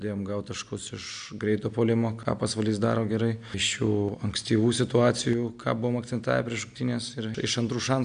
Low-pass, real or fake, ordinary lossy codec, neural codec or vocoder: 9.9 kHz; real; AAC, 96 kbps; none